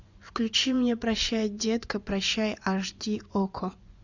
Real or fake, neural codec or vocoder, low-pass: fake; vocoder, 24 kHz, 100 mel bands, Vocos; 7.2 kHz